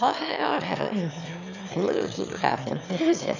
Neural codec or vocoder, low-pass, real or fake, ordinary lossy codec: autoencoder, 22.05 kHz, a latent of 192 numbers a frame, VITS, trained on one speaker; 7.2 kHz; fake; none